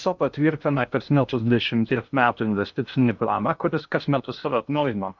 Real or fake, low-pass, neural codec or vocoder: fake; 7.2 kHz; codec, 16 kHz in and 24 kHz out, 0.6 kbps, FocalCodec, streaming, 2048 codes